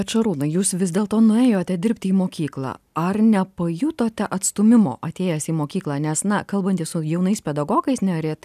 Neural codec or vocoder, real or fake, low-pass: none; real; 14.4 kHz